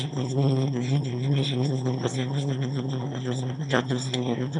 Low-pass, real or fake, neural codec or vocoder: 9.9 kHz; fake; autoencoder, 22.05 kHz, a latent of 192 numbers a frame, VITS, trained on one speaker